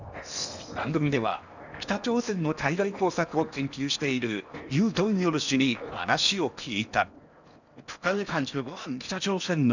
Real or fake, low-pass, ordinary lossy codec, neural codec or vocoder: fake; 7.2 kHz; none; codec, 16 kHz in and 24 kHz out, 0.8 kbps, FocalCodec, streaming, 65536 codes